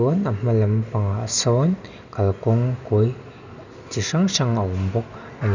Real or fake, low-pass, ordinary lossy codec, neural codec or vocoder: real; 7.2 kHz; none; none